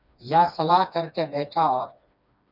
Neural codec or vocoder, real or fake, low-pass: codec, 16 kHz, 2 kbps, FreqCodec, smaller model; fake; 5.4 kHz